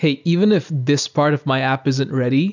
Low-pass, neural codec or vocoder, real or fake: 7.2 kHz; none; real